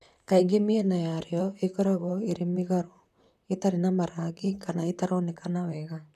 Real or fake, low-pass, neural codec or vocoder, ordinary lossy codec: fake; 14.4 kHz; vocoder, 44.1 kHz, 128 mel bands, Pupu-Vocoder; none